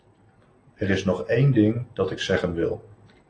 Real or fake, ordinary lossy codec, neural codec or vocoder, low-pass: real; AAC, 32 kbps; none; 9.9 kHz